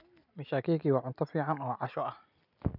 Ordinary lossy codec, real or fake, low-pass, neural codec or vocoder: none; real; 5.4 kHz; none